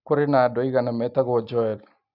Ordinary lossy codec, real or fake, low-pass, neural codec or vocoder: none; real; 5.4 kHz; none